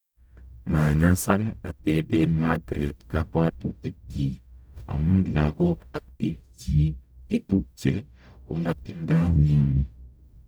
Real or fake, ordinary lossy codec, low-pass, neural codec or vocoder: fake; none; none; codec, 44.1 kHz, 0.9 kbps, DAC